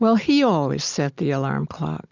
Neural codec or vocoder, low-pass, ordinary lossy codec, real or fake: none; 7.2 kHz; Opus, 64 kbps; real